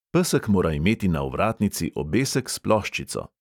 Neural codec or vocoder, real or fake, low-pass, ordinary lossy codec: none; real; 19.8 kHz; none